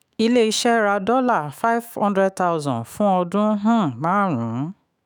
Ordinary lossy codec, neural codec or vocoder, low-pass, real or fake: none; autoencoder, 48 kHz, 128 numbers a frame, DAC-VAE, trained on Japanese speech; none; fake